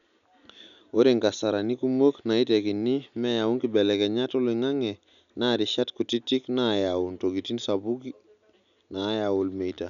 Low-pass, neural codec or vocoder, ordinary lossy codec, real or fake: 7.2 kHz; none; none; real